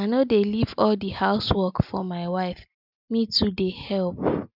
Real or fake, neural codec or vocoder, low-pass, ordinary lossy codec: real; none; 5.4 kHz; none